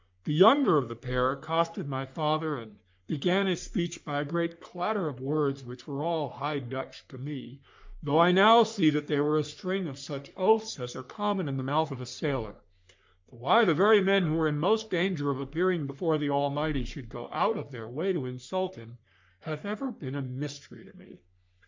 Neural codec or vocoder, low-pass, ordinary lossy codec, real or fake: codec, 44.1 kHz, 3.4 kbps, Pupu-Codec; 7.2 kHz; MP3, 64 kbps; fake